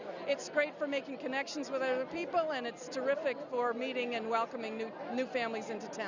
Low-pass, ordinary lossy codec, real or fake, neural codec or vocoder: 7.2 kHz; Opus, 64 kbps; real; none